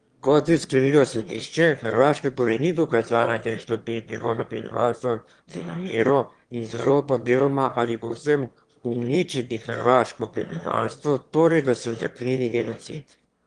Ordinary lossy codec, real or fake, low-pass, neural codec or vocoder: Opus, 24 kbps; fake; 9.9 kHz; autoencoder, 22.05 kHz, a latent of 192 numbers a frame, VITS, trained on one speaker